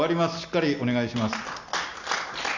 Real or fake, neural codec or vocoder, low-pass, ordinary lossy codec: real; none; 7.2 kHz; none